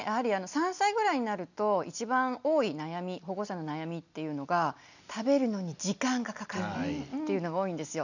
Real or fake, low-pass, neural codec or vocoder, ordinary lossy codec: real; 7.2 kHz; none; none